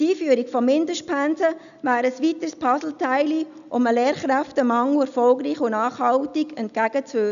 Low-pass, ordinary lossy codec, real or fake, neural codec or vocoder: 7.2 kHz; none; real; none